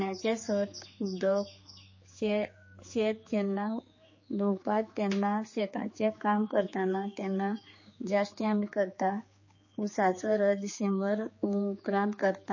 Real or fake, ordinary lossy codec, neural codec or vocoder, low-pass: fake; MP3, 32 kbps; codec, 16 kHz, 4 kbps, X-Codec, HuBERT features, trained on general audio; 7.2 kHz